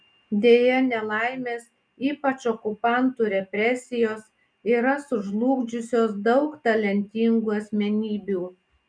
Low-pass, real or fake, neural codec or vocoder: 9.9 kHz; real; none